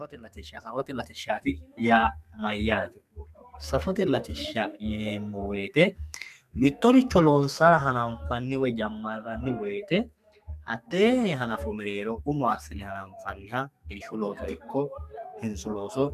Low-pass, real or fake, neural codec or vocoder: 14.4 kHz; fake; codec, 32 kHz, 1.9 kbps, SNAC